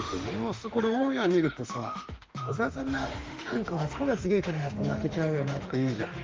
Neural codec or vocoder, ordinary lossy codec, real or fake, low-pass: autoencoder, 48 kHz, 32 numbers a frame, DAC-VAE, trained on Japanese speech; Opus, 32 kbps; fake; 7.2 kHz